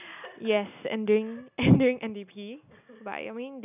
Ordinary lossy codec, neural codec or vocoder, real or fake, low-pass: none; none; real; 3.6 kHz